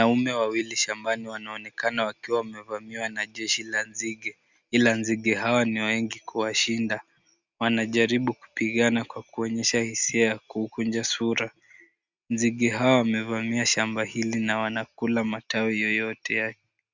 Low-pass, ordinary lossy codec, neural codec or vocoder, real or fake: 7.2 kHz; Opus, 64 kbps; none; real